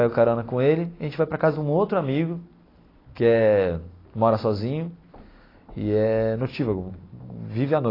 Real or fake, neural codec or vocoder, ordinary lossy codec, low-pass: real; none; AAC, 24 kbps; 5.4 kHz